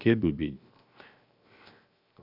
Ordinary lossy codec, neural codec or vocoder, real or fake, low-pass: none; codec, 16 kHz, 0.7 kbps, FocalCodec; fake; 5.4 kHz